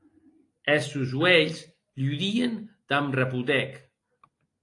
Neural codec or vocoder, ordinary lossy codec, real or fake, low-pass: none; AAC, 48 kbps; real; 10.8 kHz